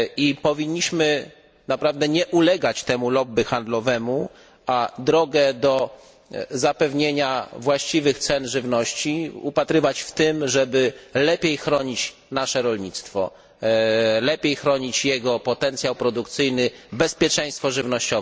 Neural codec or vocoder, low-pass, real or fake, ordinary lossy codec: none; none; real; none